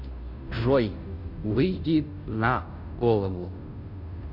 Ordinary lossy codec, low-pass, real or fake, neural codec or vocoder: none; 5.4 kHz; fake; codec, 16 kHz, 0.5 kbps, FunCodec, trained on Chinese and English, 25 frames a second